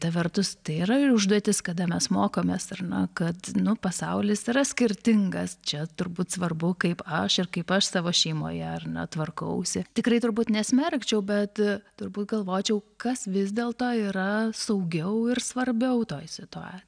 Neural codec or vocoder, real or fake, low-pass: none; real; 9.9 kHz